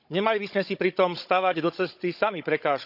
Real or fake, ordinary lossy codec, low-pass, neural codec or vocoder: fake; none; 5.4 kHz; codec, 16 kHz, 16 kbps, FunCodec, trained on Chinese and English, 50 frames a second